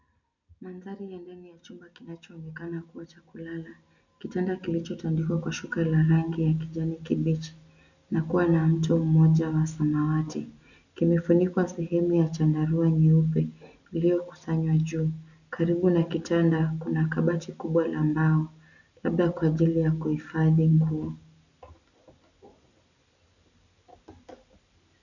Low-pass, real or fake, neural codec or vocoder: 7.2 kHz; real; none